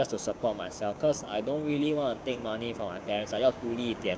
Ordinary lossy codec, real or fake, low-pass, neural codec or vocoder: none; fake; none; codec, 16 kHz, 6 kbps, DAC